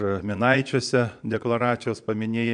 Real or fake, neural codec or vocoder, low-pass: fake; vocoder, 22.05 kHz, 80 mel bands, Vocos; 9.9 kHz